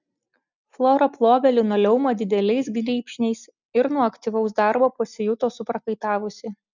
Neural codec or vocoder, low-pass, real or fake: none; 7.2 kHz; real